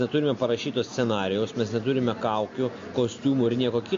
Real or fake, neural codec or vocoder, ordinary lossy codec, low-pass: real; none; MP3, 48 kbps; 7.2 kHz